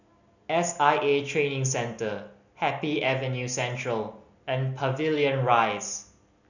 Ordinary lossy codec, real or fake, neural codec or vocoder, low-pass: none; real; none; 7.2 kHz